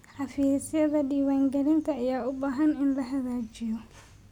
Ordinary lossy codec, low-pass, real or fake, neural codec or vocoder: none; 19.8 kHz; real; none